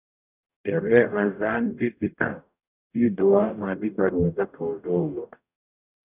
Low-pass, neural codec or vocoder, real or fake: 3.6 kHz; codec, 44.1 kHz, 0.9 kbps, DAC; fake